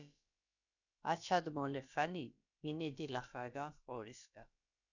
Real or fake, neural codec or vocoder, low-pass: fake; codec, 16 kHz, about 1 kbps, DyCAST, with the encoder's durations; 7.2 kHz